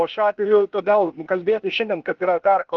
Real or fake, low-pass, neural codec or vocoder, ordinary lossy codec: fake; 7.2 kHz; codec, 16 kHz, 0.8 kbps, ZipCodec; Opus, 24 kbps